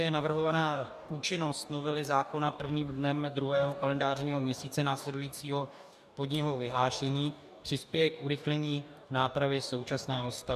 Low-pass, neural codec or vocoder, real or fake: 14.4 kHz; codec, 44.1 kHz, 2.6 kbps, DAC; fake